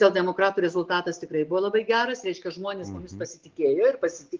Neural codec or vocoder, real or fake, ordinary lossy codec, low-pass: none; real; Opus, 24 kbps; 7.2 kHz